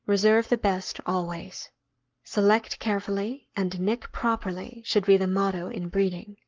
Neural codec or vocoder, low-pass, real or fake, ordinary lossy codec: codec, 44.1 kHz, 7.8 kbps, Pupu-Codec; 7.2 kHz; fake; Opus, 32 kbps